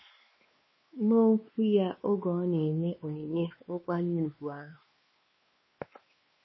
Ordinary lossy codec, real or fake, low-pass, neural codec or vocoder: MP3, 24 kbps; fake; 7.2 kHz; codec, 16 kHz, 2 kbps, X-Codec, WavLM features, trained on Multilingual LibriSpeech